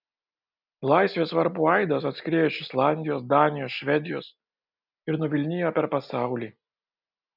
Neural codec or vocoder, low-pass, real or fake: none; 5.4 kHz; real